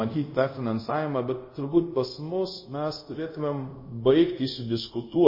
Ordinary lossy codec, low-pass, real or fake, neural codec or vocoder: MP3, 24 kbps; 5.4 kHz; fake; codec, 24 kHz, 0.5 kbps, DualCodec